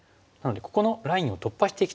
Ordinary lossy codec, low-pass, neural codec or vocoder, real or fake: none; none; none; real